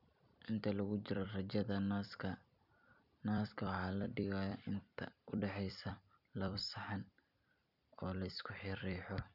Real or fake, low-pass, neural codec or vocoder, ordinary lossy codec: fake; 5.4 kHz; vocoder, 44.1 kHz, 128 mel bands every 256 samples, BigVGAN v2; none